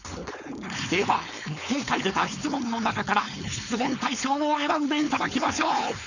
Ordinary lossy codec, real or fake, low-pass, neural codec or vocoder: none; fake; 7.2 kHz; codec, 16 kHz, 4.8 kbps, FACodec